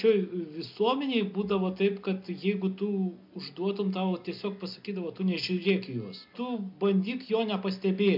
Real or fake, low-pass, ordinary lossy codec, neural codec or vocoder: real; 5.4 kHz; MP3, 48 kbps; none